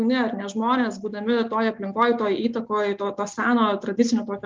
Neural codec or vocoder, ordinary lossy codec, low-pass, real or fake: none; Opus, 32 kbps; 7.2 kHz; real